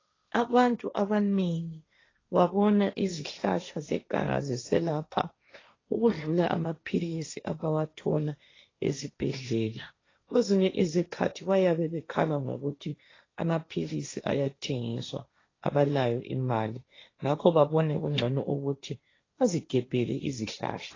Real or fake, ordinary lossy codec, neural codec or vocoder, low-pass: fake; AAC, 32 kbps; codec, 16 kHz, 1.1 kbps, Voila-Tokenizer; 7.2 kHz